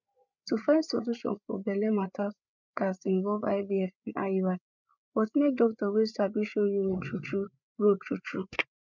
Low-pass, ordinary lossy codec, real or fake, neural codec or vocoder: 7.2 kHz; none; fake; codec, 16 kHz, 8 kbps, FreqCodec, larger model